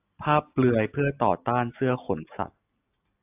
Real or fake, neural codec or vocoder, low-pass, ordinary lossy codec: real; none; 3.6 kHz; AAC, 32 kbps